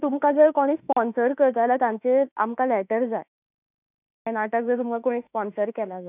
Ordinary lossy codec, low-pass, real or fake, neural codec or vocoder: none; 3.6 kHz; fake; autoencoder, 48 kHz, 32 numbers a frame, DAC-VAE, trained on Japanese speech